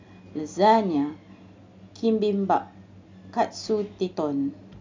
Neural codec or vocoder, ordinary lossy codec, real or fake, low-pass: none; MP3, 64 kbps; real; 7.2 kHz